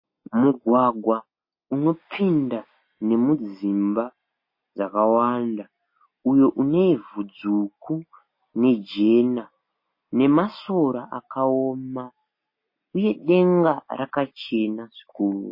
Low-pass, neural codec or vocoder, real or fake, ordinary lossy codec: 5.4 kHz; none; real; MP3, 24 kbps